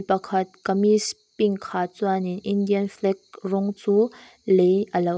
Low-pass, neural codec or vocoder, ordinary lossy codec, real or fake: none; none; none; real